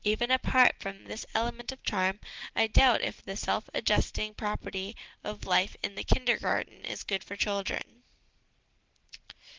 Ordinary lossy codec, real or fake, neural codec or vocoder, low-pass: Opus, 16 kbps; real; none; 7.2 kHz